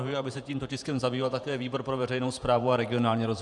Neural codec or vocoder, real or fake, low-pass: vocoder, 48 kHz, 128 mel bands, Vocos; fake; 10.8 kHz